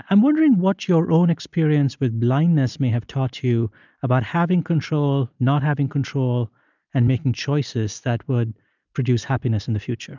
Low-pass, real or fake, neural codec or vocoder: 7.2 kHz; real; none